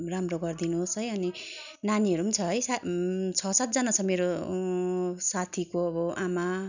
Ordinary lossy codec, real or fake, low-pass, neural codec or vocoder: none; real; 7.2 kHz; none